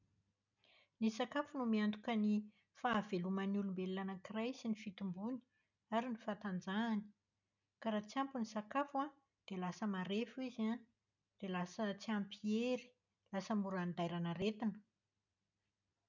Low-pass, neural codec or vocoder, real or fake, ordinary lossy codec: 7.2 kHz; none; real; none